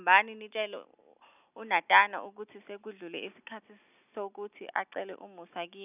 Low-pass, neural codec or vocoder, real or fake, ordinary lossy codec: 3.6 kHz; none; real; none